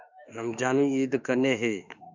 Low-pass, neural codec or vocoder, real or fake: 7.2 kHz; autoencoder, 48 kHz, 32 numbers a frame, DAC-VAE, trained on Japanese speech; fake